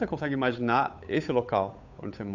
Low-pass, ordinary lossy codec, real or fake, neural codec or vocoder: 7.2 kHz; none; fake; codec, 16 kHz, 8 kbps, FunCodec, trained on Chinese and English, 25 frames a second